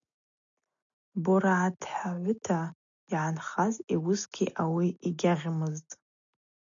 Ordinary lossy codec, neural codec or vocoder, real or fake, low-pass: MP3, 96 kbps; none; real; 7.2 kHz